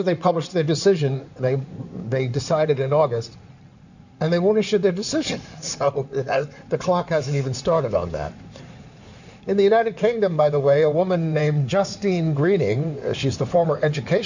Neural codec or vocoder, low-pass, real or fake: codec, 16 kHz in and 24 kHz out, 2.2 kbps, FireRedTTS-2 codec; 7.2 kHz; fake